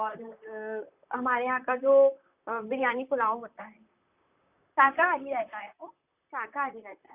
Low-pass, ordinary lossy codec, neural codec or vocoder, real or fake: 3.6 kHz; none; vocoder, 44.1 kHz, 128 mel bands, Pupu-Vocoder; fake